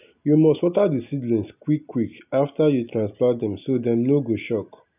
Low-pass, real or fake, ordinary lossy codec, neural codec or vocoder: 3.6 kHz; real; none; none